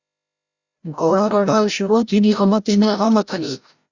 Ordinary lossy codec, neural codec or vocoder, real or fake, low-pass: Opus, 64 kbps; codec, 16 kHz, 0.5 kbps, FreqCodec, larger model; fake; 7.2 kHz